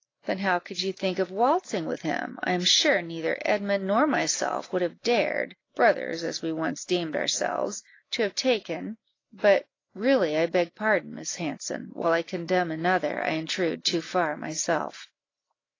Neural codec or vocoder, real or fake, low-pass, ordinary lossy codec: none; real; 7.2 kHz; AAC, 32 kbps